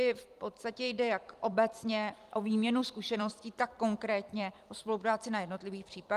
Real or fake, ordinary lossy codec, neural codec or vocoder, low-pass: fake; Opus, 32 kbps; vocoder, 44.1 kHz, 128 mel bands every 256 samples, BigVGAN v2; 14.4 kHz